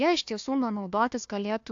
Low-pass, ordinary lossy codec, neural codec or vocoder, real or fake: 7.2 kHz; AAC, 64 kbps; codec, 16 kHz, 1 kbps, FunCodec, trained on Chinese and English, 50 frames a second; fake